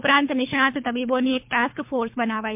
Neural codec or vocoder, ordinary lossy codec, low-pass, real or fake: codec, 24 kHz, 3 kbps, HILCodec; MP3, 32 kbps; 3.6 kHz; fake